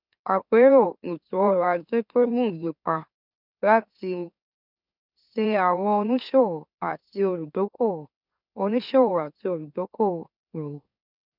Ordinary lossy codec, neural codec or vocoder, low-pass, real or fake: none; autoencoder, 44.1 kHz, a latent of 192 numbers a frame, MeloTTS; 5.4 kHz; fake